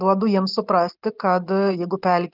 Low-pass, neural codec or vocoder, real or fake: 5.4 kHz; none; real